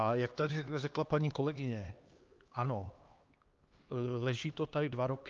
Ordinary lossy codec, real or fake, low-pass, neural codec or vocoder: Opus, 32 kbps; fake; 7.2 kHz; codec, 16 kHz, 2 kbps, X-Codec, HuBERT features, trained on LibriSpeech